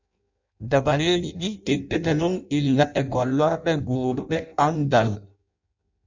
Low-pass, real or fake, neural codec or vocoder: 7.2 kHz; fake; codec, 16 kHz in and 24 kHz out, 0.6 kbps, FireRedTTS-2 codec